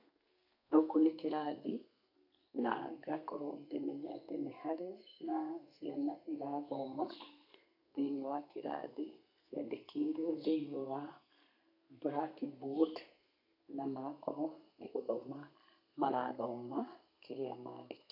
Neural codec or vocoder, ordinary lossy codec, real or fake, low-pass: codec, 32 kHz, 1.9 kbps, SNAC; none; fake; 5.4 kHz